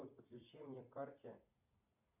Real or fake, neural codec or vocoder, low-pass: fake; vocoder, 44.1 kHz, 80 mel bands, Vocos; 3.6 kHz